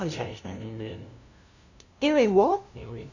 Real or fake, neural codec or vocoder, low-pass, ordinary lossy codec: fake; codec, 16 kHz, 0.5 kbps, FunCodec, trained on LibriTTS, 25 frames a second; 7.2 kHz; none